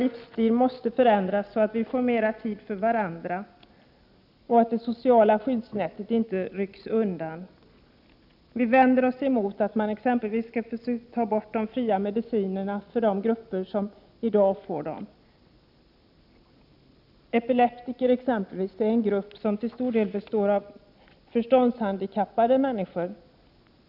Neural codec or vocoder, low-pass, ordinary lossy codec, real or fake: none; 5.4 kHz; none; real